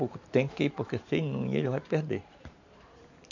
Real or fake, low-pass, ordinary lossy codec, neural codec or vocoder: fake; 7.2 kHz; none; vocoder, 44.1 kHz, 128 mel bands every 512 samples, BigVGAN v2